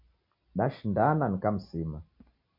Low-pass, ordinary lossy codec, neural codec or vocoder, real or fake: 5.4 kHz; MP3, 32 kbps; none; real